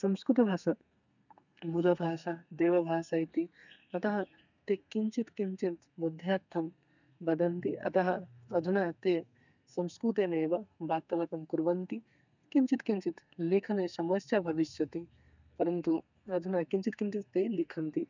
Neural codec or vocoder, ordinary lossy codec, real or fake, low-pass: codec, 32 kHz, 1.9 kbps, SNAC; none; fake; 7.2 kHz